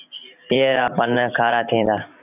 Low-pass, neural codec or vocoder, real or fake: 3.6 kHz; vocoder, 44.1 kHz, 128 mel bands every 512 samples, BigVGAN v2; fake